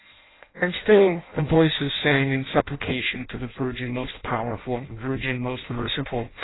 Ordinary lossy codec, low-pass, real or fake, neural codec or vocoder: AAC, 16 kbps; 7.2 kHz; fake; codec, 16 kHz in and 24 kHz out, 0.6 kbps, FireRedTTS-2 codec